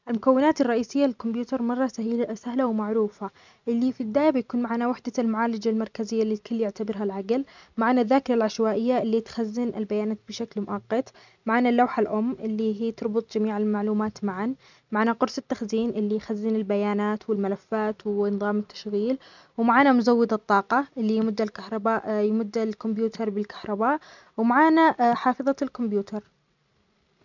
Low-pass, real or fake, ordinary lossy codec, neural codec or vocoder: 7.2 kHz; real; none; none